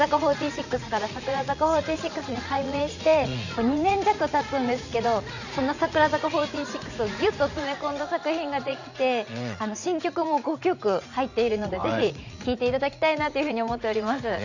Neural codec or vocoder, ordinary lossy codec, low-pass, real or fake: vocoder, 44.1 kHz, 80 mel bands, Vocos; none; 7.2 kHz; fake